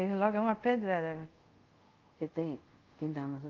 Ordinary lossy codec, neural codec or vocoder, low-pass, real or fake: Opus, 32 kbps; codec, 24 kHz, 0.5 kbps, DualCodec; 7.2 kHz; fake